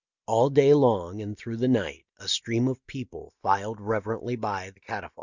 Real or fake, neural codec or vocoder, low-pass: real; none; 7.2 kHz